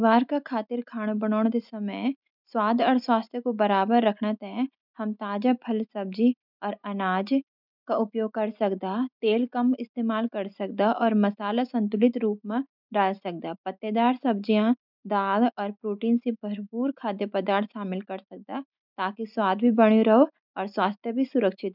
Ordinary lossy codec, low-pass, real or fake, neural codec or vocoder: none; 5.4 kHz; real; none